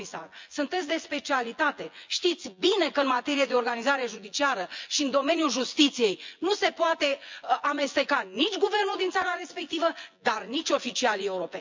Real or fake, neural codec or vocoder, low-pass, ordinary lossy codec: fake; vocoder, 24 kHz, 100 mel bands, Vocos; 7.2 kHz; MP3, 64 kbps